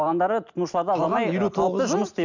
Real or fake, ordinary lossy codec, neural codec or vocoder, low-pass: real; none; none; 7.2 kHz